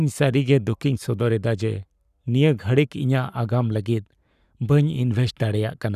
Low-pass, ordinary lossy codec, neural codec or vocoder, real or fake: 14.4 kHz; none; codec, 44.1 kHz, 7.8 kbps, Pupu-Codec; fake